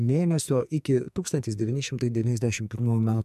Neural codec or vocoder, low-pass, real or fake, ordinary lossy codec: codec, 44.1 kHz, 2.6 kbps, SNAC; 14.4 kHz; fake; MP3, 96 kbps